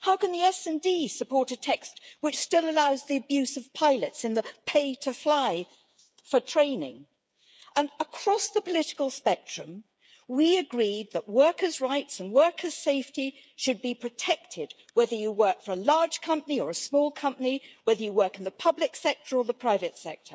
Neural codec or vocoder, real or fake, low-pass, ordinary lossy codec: codec, 16 kHz, 8 kbps, FreqCodec, smaller model; fake; none; none